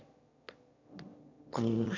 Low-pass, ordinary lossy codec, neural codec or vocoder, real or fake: 7.2 kHz; MP3, 48 kbps; autoencoder, 22.05 kHz, a latent of 192 numbers a frame, VITS, trained on one speaker; fake